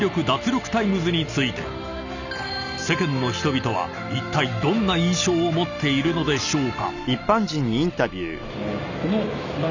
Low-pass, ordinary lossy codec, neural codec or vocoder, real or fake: 7.2 kHz; none; none; real